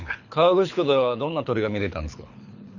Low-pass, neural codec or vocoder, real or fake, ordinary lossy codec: 7.2 kHz; codec, 24 kHz, 6 kbps, HILCodec; fake; none